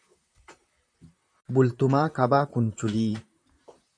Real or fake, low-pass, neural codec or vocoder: fake; 9.9 kHz; vocoder, 44.1 kHz, 128 mel bands, Pupu-Vocoder